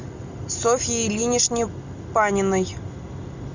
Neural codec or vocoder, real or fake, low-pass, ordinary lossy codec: none; real; 7.2 kHz; Opus, 64 kbps